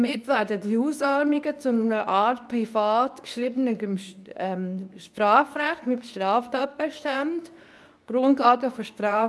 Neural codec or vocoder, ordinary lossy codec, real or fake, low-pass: codec, 24 kHz, 0.9 kbps, WavTokenizer, medium speech release version 2; none; fake; none